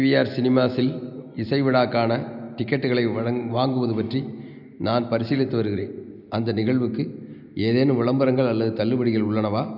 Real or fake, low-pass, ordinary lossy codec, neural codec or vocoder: real; 5.4 kHz; none; none